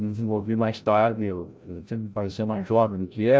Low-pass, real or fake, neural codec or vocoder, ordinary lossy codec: none; fake; codec, 16 kHz, 0.5 kbps, FreqCodec, larger model; none